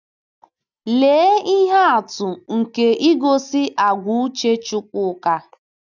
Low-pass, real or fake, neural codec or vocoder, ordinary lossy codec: 7.2 kHz; real; none; none